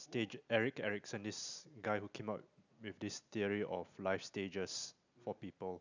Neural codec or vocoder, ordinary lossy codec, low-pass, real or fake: none; none; 7.2 kHz; real